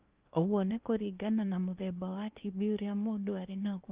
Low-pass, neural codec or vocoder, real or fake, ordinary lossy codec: 3.6 kHz; codec, 16 kHz in and 24 kHz out, 0.8 kbps, FocalCodec, streaming, 65536 codes; fake; Opus, 24 kbps